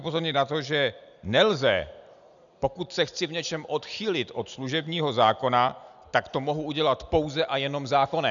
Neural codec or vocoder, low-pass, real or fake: none; 7.2 kHz; real